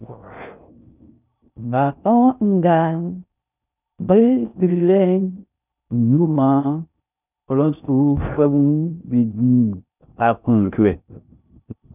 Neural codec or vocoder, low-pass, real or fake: codec, 16 kHz in and 24 kHz out, 0.6 kbps, FocalCodec, streaming, 2048 codes; 3.6 kHz; fake